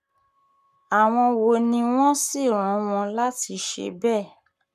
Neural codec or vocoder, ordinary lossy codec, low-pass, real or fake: codec, 44.1 kHz, 7.8 kbps, Pupu-Codec; none; 14.4 kHz; fake